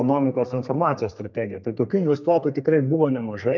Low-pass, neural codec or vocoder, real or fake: 7.2 kHz; codec, 32 kHz, 1.9 kbps, SNAC; fake